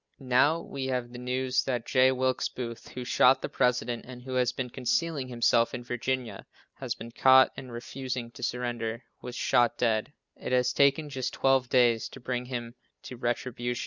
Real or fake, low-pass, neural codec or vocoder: real; 7.2 kHz; none